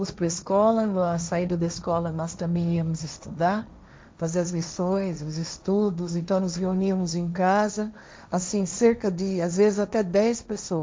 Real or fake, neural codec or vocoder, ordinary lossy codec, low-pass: fake; codec, 16 kHz, 1.1 kbps, Voila-Tokenizer; none; none